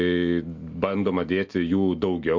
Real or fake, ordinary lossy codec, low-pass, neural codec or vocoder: real; MP3, 48 kbps; 7.2 kHz; none